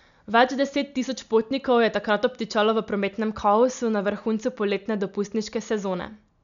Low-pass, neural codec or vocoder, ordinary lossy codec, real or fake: 7.2 kHz; none; none; real